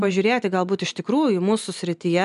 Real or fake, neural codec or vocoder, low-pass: real; none; 10.8 kHz